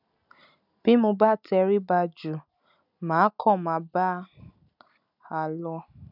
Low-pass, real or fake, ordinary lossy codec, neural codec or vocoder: 5.4 kHz; real; none; none